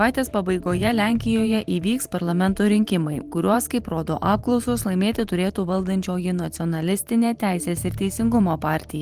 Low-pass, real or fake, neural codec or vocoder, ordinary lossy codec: 14.4 kHz; fake; vocoder, 44.1 kHz, 128 mel bands every 512 samples, BigVGAN v2; Opus, 24 kbps